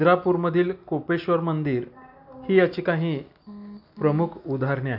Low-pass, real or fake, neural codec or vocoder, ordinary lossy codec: 5.4 kHz; real; none; none